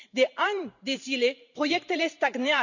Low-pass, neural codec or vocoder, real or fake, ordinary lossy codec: 7.2 kHz; vocoder, 44.1 kHz, 128 mel bands every 512 samples, BigVGAN v2; fake; none